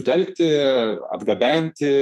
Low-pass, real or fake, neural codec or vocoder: 14.4 kHz; fake; codec, 44.1 kHz, 2.6 kbps, SNAC